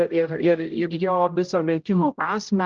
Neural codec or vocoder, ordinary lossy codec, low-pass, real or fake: codec, 16 kHz, 0.5 kbps, X-Codec, HuBERT features, trained on general audio; Opus, 24 kbps; 7.2 kHz; fake